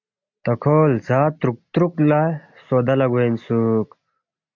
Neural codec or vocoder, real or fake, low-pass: none; real; 7.2 kHz